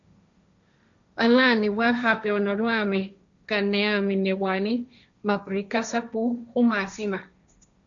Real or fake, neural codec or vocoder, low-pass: fake; codec, 16 kHz, 1.1 kbps, Voila-Tokenizer; 7.2 kHz